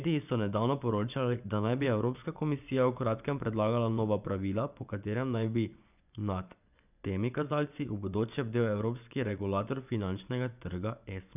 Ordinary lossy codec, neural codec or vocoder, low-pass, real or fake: none; vocoder, 24 kHz, 100 mel bands, Vocos; 3.6 kHz; fake